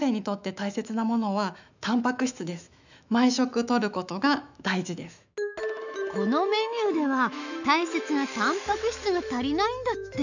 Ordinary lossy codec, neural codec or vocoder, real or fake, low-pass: none; autoencoder, 48 kHz, 128 numbers a frame, DAC-VAE, trained on Japanese speech; fake; 7.2 kHz